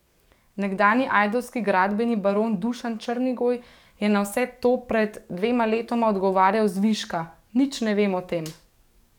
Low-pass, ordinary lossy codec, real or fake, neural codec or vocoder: 19.8 kHz; none; fake; codec, 44.1 kHz, 7.8 kbps, DAC